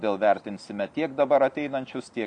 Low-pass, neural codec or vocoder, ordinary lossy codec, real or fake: 9.9 kHz; none; AAC, 64 kbps; real